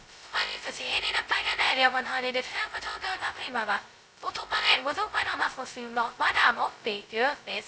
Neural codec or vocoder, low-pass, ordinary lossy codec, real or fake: codec, 16 kHz, 0.2 kbps, FocalCodec; none; none; fake